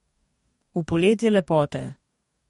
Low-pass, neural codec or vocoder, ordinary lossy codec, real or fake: 19.8 kHz; codec, 44.1 kHz, 2.6 kbps, DAC; MP3, 48 kbps; fake